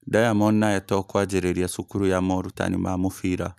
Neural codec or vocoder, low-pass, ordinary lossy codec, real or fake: none; 14.4 kHz; none; real